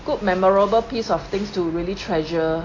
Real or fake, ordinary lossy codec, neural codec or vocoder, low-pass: real; AAC, 32 kbps; none; 7.2 kHz